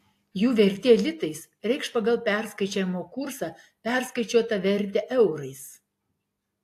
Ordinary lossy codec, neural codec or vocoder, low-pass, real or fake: AAC, 64 kbps; none; 14.4 kHz; real